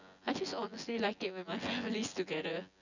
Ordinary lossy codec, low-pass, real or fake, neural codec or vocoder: none; 7.2 kHz; fake; vocoder, 24 kHz, 100 mel bands, Vocos